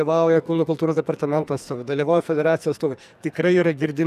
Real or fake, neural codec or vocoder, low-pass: fake; codec, 44.1 kHz, 2.6 kbps, SNAC; 14.4 kHz